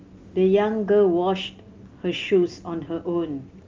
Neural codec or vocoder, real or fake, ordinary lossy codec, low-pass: none; real; Opus, 32 kbps; 7.2 kHz